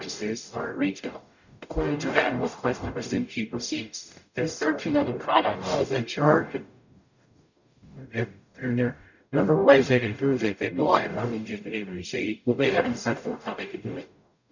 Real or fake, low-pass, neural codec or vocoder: fake; 7.2 kHz; codec, 44.1 kHz, 0.9 kbps, DAC